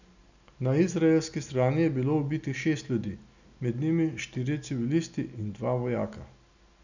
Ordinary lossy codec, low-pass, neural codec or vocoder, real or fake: none; 7.2 kHz; none; real